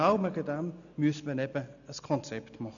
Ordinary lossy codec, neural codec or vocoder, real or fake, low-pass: none; none; real; 7.2 kHz